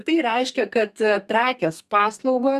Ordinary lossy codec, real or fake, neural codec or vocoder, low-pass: Opus, 64 kbps; fake; codec, 32 kHz, 1.9 kbps, SNAC; 14.4 kHz